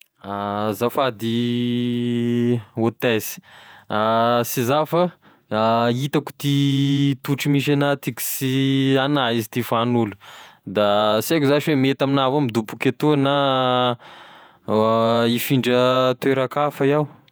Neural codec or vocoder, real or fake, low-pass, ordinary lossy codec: vocoder, 48 kHz, 128 mel bands, Vocos; fake; none; none